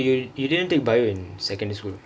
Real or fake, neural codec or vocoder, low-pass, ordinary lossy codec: real; none; none; none